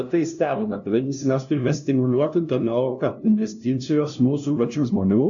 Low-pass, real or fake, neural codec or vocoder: 7.2 kHz; fake; codec, 16 kHz, 0.5 kbps, FunCodec, trained on LibriTTS, 25 frames a second